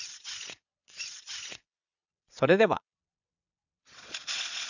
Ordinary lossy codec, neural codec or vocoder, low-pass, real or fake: none; vocoder, 22.05 kHz, 80 mel bands, Vocos; 7.2 kHz; fake